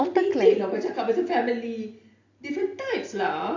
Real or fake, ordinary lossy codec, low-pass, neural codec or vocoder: real; AAC, 48 kbps; 7.2 kHz; none